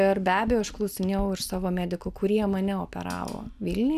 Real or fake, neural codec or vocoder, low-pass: real; none; 14.4 kHz